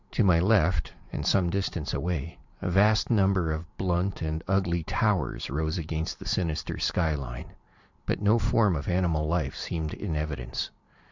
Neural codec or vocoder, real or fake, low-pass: vocoder, 44.1 kHz, 128 mel bands every 512 samples, BigVGAN v2; fake; 7.2 kHz